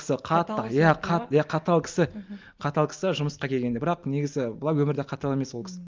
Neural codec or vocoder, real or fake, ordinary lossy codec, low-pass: none; real; Opus, 32 kbps; 7.2 kHz